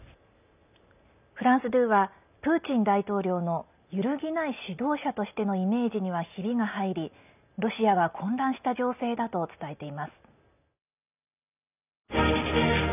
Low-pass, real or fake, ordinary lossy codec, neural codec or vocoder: 3.6 kHz; real; none; none